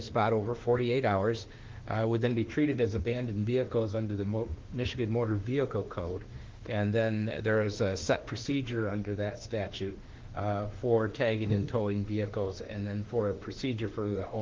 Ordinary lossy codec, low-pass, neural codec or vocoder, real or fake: Opus, 16 kbps; 7.2 kHz; autoencoder, 48 kHz, 32 numbers a frame, DAC-VAE, trained on Japanese speech; fake